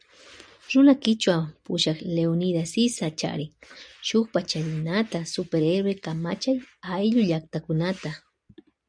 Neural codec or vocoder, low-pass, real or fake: none; 9.9 kHz; real